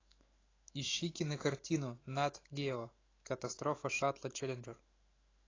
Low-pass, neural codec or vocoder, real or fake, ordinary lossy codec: 7.2 kHz; autoencoder, 48 kHz, 128 numbers a frame, DAC-VAE, trained on Japanese speech; fake; AAC, 32 kbps